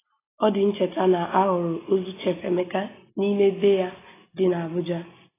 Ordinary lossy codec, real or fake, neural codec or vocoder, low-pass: AAC, 16 kbps; real; none; 3.6 kHz